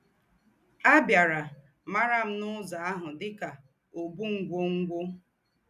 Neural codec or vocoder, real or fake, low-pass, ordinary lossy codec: none; real; 14.4 kHz; none